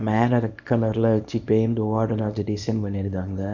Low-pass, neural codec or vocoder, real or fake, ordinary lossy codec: 7.2 kHz; codec, 24 kHz, 0.9 kbps, WavTokenizer, small release; fake; none